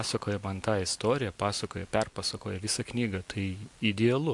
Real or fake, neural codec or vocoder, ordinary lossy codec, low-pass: real; none; MP3, 64 kbps; 10.8 kHz